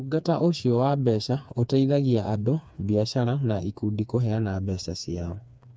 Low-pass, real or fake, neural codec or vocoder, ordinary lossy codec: none; fake; codec, 16 kHz, 4 kbps, FreqCodec, smaller model; none